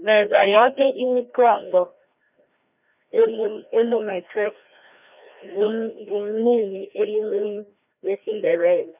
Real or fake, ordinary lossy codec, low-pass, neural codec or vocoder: fake; none; 3.6 kHz; codec, 16 kHz, 1 kbps, FreqCodec, larger model